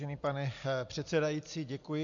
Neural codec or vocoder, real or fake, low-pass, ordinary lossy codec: none; real; 7.2 kHz; AAC, 64 kbps